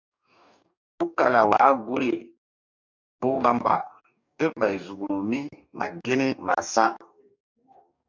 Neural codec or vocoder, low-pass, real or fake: codec, 44.1 kHz, 2.6 kbps, DAC; 7.2 kHz; fake